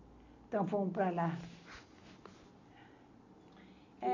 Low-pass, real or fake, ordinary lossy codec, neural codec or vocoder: 7.2 kHz; real; none; none